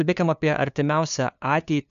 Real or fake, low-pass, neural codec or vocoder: fake; 7.2 kHz; codec, 16 kHz, 2 kbps, FunCodec, trained on LibriTTS, 25 frames a second